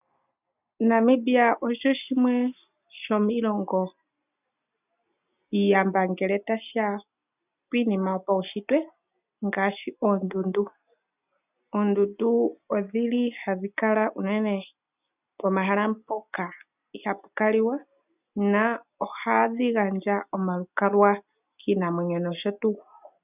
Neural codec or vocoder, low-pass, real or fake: none; 3.6 kHz; real